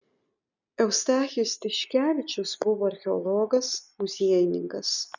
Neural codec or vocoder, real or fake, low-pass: none; real; 7.2 kHz